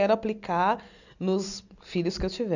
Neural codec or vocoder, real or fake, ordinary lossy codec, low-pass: none; real; none; 7.2 kHz